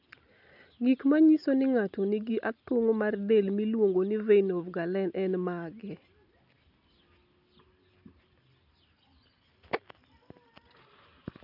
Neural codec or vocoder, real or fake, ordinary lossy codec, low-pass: none; real; none; 5.4 kHz